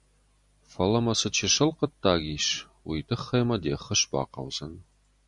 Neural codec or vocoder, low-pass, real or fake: none; 10.8 kHz; real